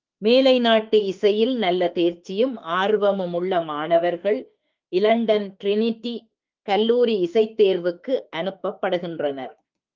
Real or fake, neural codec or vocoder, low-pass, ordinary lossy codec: fake; autoencoder, 48 kHz, 32 numbers a frame, DAC-VAE, trained on Japanese speech; 7.2 kHz; Opus, 24 kbps